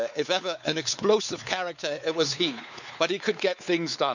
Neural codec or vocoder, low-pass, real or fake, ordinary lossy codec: codec, 16 kHz, 4 kbps, X-Codec, WavLM features, trained on Multilingual LibriSpeech; 7.2 kHz; fake; none